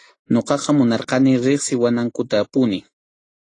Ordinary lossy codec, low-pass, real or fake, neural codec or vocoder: AAC, 32 kbps; 9.9 kHz; real; none